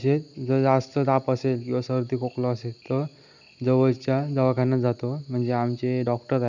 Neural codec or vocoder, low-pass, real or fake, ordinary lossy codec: none; 7.2 kHz; real; none